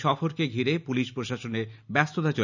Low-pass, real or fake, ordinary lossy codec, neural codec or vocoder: 7.2 kHz; real; none; none